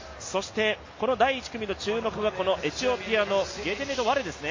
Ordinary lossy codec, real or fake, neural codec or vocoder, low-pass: MP3, 32 kbps; real; none; 7.2 kHz